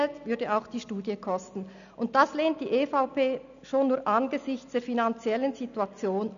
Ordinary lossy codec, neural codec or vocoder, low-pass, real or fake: none; none; 7.2 kHz; real